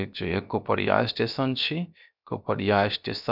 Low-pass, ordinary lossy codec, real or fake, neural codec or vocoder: 5.4 kHz; none; fake; codec, 16 kHz, 0.7 kbps, FocalCodec